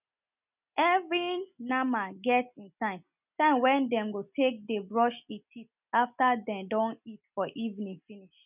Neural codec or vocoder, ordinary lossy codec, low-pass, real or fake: none; none; 3.6 kHz; real